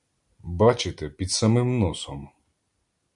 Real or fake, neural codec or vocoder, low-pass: real; none; 10.8 kHz